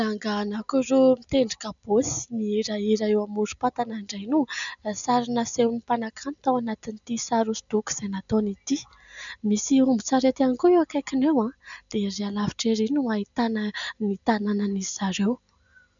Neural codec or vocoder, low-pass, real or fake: none; 7.2 kHz; real